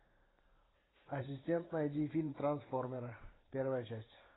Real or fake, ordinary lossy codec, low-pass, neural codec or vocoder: fake; AAC, 16 kbps; 7.2 kHz; vocoder, 44.1 kHz, 128 mel bands every 512 samples, BigVGAN v2